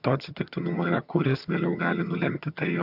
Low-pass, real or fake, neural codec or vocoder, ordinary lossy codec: 5.4 kHz; fake; vocoder, 22.05 kHz, 80 mel bands, HiFi-GAN; AAC, 48 kbps